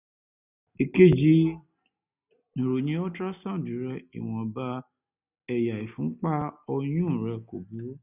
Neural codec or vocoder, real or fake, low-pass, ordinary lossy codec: none; real; 3.6 kHz; none